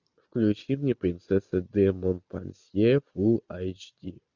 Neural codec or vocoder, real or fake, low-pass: none; real; 7.2 kHz